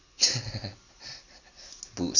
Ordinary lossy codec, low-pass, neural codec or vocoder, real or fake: none; 7.2 kHz; none; real